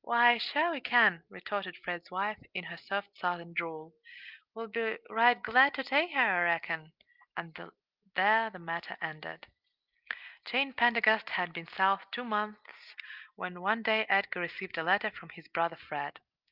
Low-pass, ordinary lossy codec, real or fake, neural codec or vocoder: 5.4 kHz; Opus, 32 kbps; real; none